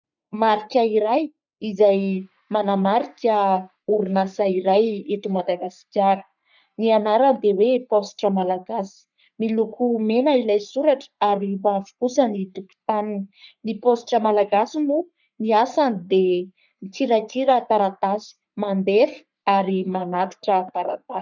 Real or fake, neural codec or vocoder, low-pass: fake; codec, 44.1 kHz, 3.4 kbps, Pupu-Codec; 7.2 kHz